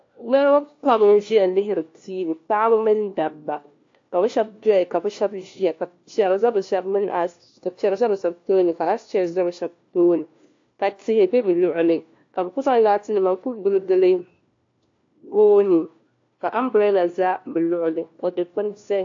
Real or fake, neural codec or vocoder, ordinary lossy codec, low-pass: fake; codec, 16 kHz, 1 kbps, FunCodec, trained on LibriTTS, 50 frames a second; AAC, 48 kbps; 7.2 kHz